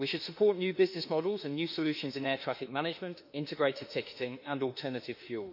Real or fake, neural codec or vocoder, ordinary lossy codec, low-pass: fake; autoencoder, 48 kHz, 32 numbers a frame, DAC-VAE, trained on Japanese speech; MP3, 32 kbps; 5.4 kHz